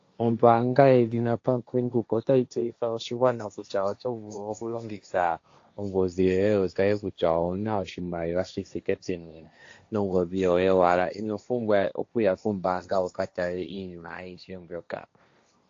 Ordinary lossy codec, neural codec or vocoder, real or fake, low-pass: AAC, 48 kbps; codec, 16 kHz, 1.1 kbps, Voila-Tokenizer; fake; 7.2 kHz